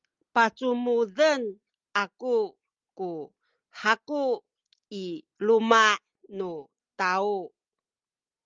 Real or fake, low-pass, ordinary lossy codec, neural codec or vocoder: real; 7.2 kHz; Opus, 32 kbps; none